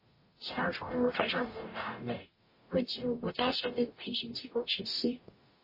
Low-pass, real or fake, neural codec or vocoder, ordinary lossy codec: 5.4 kHz; fake; codec, 44.1 kHz, 0.9 kbps, DAC; MP3, 24 kbps